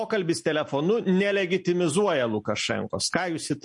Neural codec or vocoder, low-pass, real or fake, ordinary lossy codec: none; 10.8 kHz; real; MP3, 48 kbps